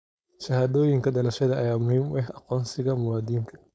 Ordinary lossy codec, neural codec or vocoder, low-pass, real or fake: none; codec, 16 kHz, 4.8 kbps, FACodec; none; fake